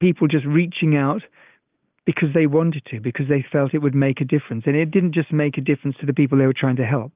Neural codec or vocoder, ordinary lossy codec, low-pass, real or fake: none; Opus, 24 kbps; 3.6 kHz; real